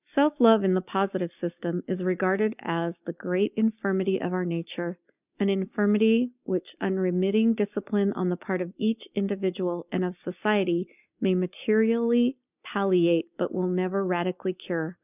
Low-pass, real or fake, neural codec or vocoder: 3.6 kHz; real; none